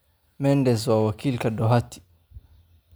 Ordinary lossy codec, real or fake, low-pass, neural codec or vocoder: none; real; none; none